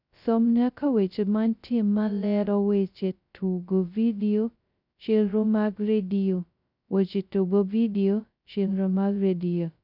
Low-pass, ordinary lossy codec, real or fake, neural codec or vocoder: 5.4 kHz; none; fake; codec, 16 kHz, 0.2 kbps, FocalCodec